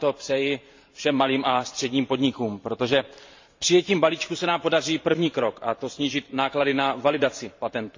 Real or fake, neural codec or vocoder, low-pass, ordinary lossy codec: fake; vocoder, 44.1 kHz, 128 mel bands every 512 samples, BigVGAN v2; 7.2 kHz; MP3, 48 kbps